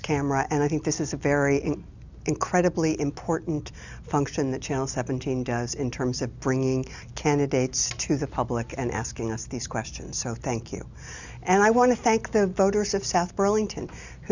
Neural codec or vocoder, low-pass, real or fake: none; 7.2 kHz; real